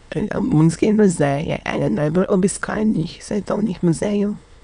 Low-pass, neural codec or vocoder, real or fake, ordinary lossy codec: 9.9 kHz; autoencoder, 22.05 kHz, a latent of 192 numbers a frame, VITS, trained on many speakers; fake; none